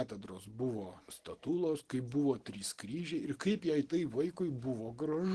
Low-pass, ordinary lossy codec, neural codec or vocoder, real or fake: 10.8 kHz; Opus, 16 kbps; none; real